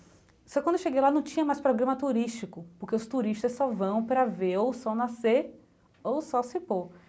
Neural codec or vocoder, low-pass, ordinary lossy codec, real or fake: none; none; none; real